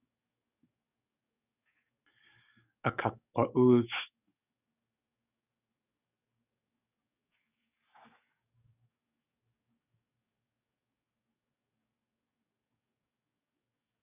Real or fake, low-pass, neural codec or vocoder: fake; 3.6 kHz; codec, 44.1 kHz, 7.8 kbps, DAC